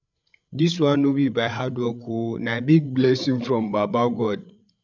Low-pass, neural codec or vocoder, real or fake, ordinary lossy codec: 7.2 kHz; codec, 16 kHz, 8 kbps, FreqCodec, larger model; fake; none